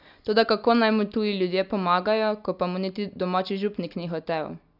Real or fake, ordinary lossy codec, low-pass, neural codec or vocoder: real; none; 5.4 kHz; none